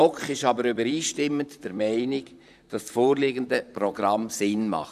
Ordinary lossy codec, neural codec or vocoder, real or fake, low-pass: none; vocoder, 44.1 kHz, 128 mel bands, Pupu-Vocoder; fake; 14.4 kHz